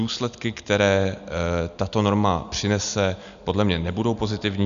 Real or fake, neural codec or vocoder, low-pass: real; none; 7.2 kHz